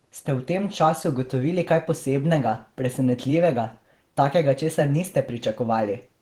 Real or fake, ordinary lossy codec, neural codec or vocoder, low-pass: fake; Opus, 16 kbps; vocoder, 48 kHz, 128 mel bands, Vocos; 19.8 kHz